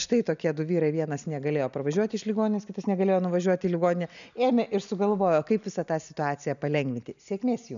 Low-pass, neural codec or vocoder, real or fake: 7.2 kHz; none; real